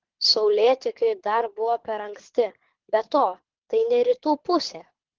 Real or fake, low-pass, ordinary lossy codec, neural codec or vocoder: fake; 7.2 kHz; Opus, 16 kbps; codec, 24 kHz, 6 kbps, HILCodec